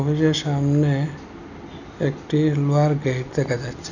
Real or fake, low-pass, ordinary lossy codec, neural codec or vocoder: real; 7.2 kHz; none; none